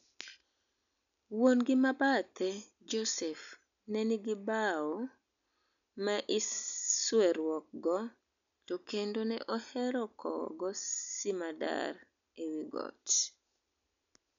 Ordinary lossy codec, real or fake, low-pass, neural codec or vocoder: none; real; 7.2 kHz; none